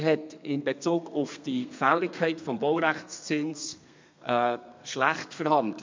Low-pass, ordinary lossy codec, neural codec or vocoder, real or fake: 7.2 kHz; MP3, 64 kbps; codec, 32 kHz, 1.9 kbps, SNAC; fake